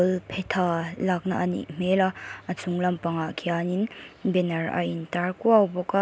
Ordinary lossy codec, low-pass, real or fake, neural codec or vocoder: none; none; real; none